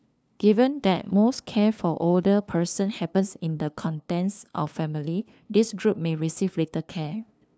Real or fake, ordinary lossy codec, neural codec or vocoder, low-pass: fake; none; codec, 16 kHz, 2 kbps, FunCodec, trained on LibriTTS, 25 frames a second; none